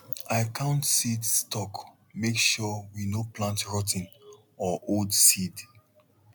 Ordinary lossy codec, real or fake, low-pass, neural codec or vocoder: none; real; none; none